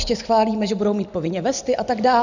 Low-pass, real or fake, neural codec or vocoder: 7.2 kHz; real; none